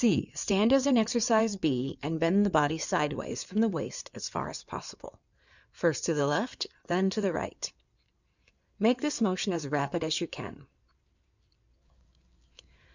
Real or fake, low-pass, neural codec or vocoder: fake; 7.2 kHz; codec, 16 kHz in and 24 kHz out, 2.2 kbps, FireRedTTS-2 codec